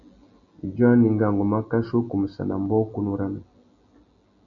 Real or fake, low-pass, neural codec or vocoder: real; 7.2 kHz; none